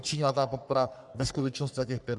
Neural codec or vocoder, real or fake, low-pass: codec, 44.1 kHz, 3.4 kbps, Pupu-Codec; fake; 10.8 kHz